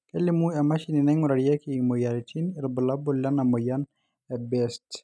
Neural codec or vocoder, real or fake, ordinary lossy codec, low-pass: none; real; none; none